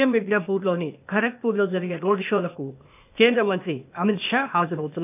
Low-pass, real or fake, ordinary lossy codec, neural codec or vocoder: 3.6 kHz; fake; none; codec, 16 kHz, 0.8 kbps, ZipCodec